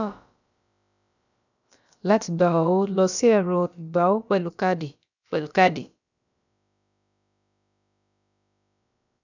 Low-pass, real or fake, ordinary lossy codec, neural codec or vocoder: 7.2 kHz; fake; none; codec, 16 kHz, about 1 kbps, DyCAST, with the encoder's durations